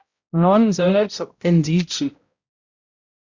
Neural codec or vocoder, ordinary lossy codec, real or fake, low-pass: codec, 16 kHz, 0.5 kbps, X-Codec, HuBERT features, trained on balanced general audio; Opus, 64 kbps; fake; 7.2 kHz